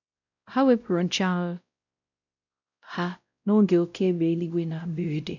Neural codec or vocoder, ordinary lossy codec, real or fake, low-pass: codec, 16 kHz, 0.5 kbps, X-Codec, WavLM features, trained on Multilingual LibriSpeech; none; fake; 7.2 kHz